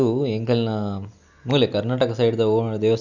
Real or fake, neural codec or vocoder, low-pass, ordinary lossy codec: real; none; 7.2 kHz; none